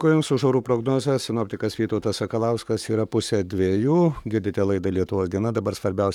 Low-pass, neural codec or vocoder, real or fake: 19.8 kHz; codec, 44.1 kHz, 7.8 kbps, Pupu-Codec; fake